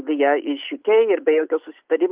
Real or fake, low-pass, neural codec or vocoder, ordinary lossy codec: real; 3.6 kHz; none; Opus, 24 kbps